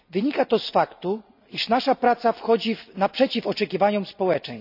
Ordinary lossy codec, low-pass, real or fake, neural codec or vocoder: none; 5.4 kHz; real; none